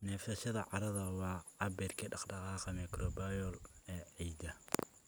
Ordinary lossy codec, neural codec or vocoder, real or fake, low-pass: none; none; real; none